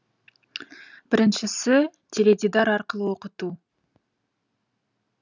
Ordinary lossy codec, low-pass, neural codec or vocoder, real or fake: none; 7.2 kHz; none; real